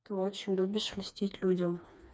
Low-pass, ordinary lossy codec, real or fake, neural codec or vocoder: none; none; fake; codec, 16 kHz, 2 kbps, FreqCodec, smaller model